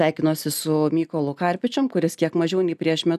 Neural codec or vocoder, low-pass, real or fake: none; 14.4 kHz; real